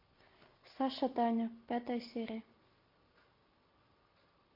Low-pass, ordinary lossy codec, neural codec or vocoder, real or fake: 5.4 kHz; MP3, 32 kbps; none; real